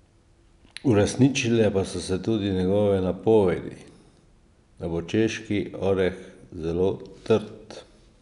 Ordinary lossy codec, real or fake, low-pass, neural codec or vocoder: none; real; 10.8 kHz; none